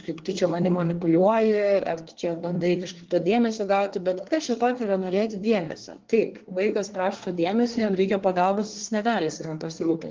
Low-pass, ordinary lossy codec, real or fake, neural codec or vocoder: 7.2 kHz; Opus, 16 kbps; fake; codec, 24 kHz, 1 kbps, SNAC